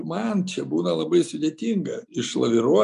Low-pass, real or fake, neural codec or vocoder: 10.8 kHz; real; none